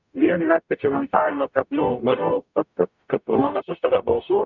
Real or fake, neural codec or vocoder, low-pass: fake; codec, 44.1 kHz, 0.9 kbps, DAC; 7.2 kHz